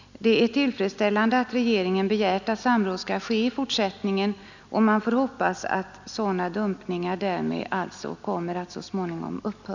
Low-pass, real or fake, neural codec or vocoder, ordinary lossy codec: 7.2 kHz; real; none; none